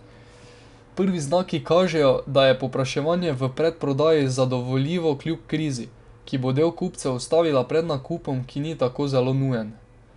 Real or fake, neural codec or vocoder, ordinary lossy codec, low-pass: real; none; none; 10.8 kHz